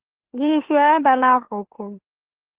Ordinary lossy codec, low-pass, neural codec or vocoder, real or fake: Opus, 16 kbps; 3.6 kHz; autoencoder, 44.1 kHz, a latent of 192 numbers a frame, MeloTTS; fake